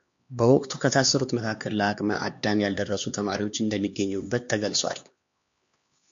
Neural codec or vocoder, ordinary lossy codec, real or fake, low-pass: codec, 16 kHz, 2 kbps, X-Codec, HuBERT features, trained on LibriSpeech; MP3, 48 kbps; fake; 7.2 kHz